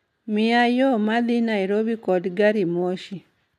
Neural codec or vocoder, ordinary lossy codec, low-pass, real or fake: none; none; 14.4 kHz; real